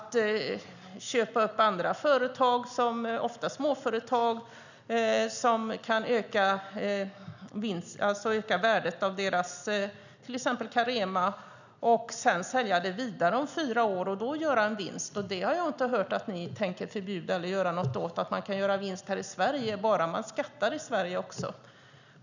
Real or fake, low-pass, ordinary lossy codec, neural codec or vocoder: real; 7.2 kHz; none; none